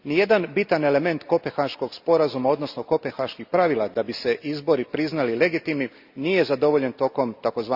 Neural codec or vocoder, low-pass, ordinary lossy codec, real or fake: none; 5.4 kHz; Opus, 64 kbps; real